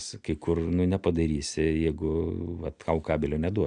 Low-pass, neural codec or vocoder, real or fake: 9.9 kHz; none; real